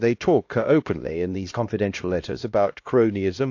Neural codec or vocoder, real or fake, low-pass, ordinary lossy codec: codec, 16 kHz, 1 kbps, X-Codec, HuBERT features, trained on LibriSpeech; fake; 7.2 kHz; AAC, 48 kbps